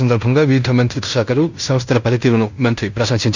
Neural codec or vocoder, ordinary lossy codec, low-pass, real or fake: codec, 16 kHz in and 24 kHz out, 0.9 kbps, LongCat-Audio-Codec, fine tuned four codebook decoder; none; 7.2 kHz; fake